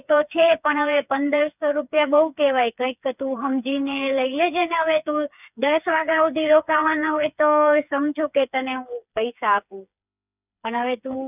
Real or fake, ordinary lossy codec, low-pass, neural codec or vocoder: fake; none; 3.6 kHz; codec, 16 kHz, 4 kbps, FreqCodec, smaller model